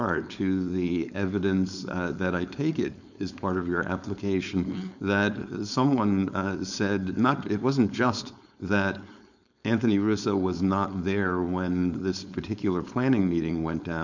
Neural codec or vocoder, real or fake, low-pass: codec, 16 kHz, 4.8 kbps, FACodec; fake; 7.2 kHz